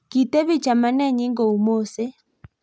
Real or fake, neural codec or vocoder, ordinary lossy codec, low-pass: real; none; none; none